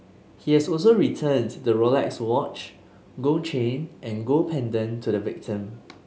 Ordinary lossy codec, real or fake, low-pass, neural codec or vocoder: none; real; none; none